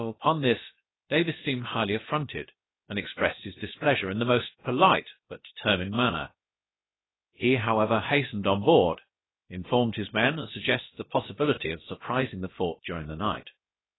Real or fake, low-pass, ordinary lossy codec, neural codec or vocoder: fake; 7.2 kHz; AAC, 16 kbps; codec, 16 kHz, about 1 kbps, DyCAST, with the encoder's durations